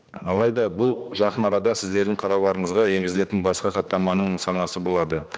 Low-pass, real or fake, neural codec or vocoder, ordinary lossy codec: none; fake; codec, 16 kHz, 2 kbps, X-Codec, HuBERT features, trained on general audio; none